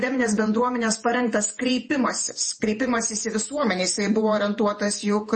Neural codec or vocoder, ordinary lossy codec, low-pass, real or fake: vocoder, 48 kHz, 128 mel bands, Vocos; MP3, 32 kbps; 10.8 kHz; fake